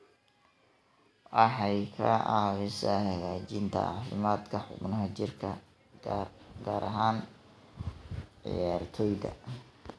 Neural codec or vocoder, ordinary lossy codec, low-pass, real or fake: none; none; none; real